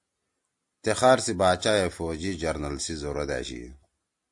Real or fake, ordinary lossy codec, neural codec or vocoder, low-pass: real; AAC, 64 kbps; none; 10.8 kHz